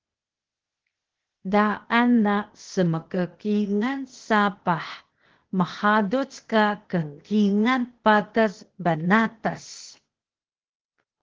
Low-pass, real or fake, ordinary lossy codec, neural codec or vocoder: 7.2 kHz; fake; Opus, 16 kbps; codec, 16 kHz, 0.8 kbps, ZipCodec